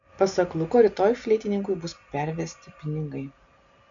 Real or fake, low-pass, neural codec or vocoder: real; 7.2 kHz; none